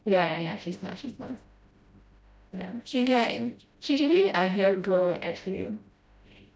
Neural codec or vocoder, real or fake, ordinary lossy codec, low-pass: codec, 16 kHz, 0.5 kbps, FreqCodec, smaller model; fake; none; none